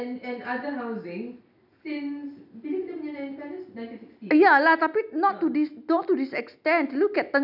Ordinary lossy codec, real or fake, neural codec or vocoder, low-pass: none; real; none; 5.4 kHz